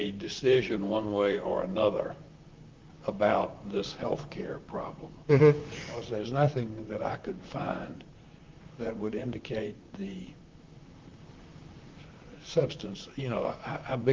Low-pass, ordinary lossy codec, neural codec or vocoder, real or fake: 7.2 kHz; Opus, 24 kbps; vocoder, 44.1 kHz, 128 mel bands, Pupu-Vocoder; fake